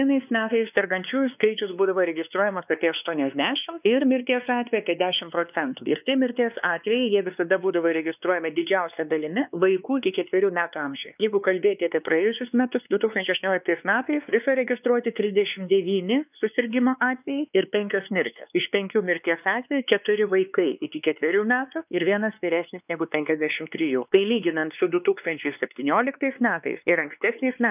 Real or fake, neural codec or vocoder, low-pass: fake; codec, 16 kHz, 2 kbps, X-Codec, WavLM features, trained on Multilingual LibriSpeech; 3.6 kHz